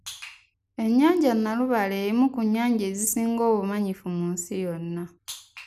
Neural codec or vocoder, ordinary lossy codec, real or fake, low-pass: none; none; real; 14.4 kHz